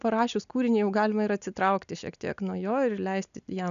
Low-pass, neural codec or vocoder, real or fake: 7.2 kHz; none; real